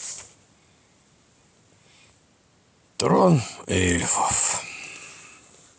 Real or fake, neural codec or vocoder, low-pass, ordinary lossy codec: real; none; none; none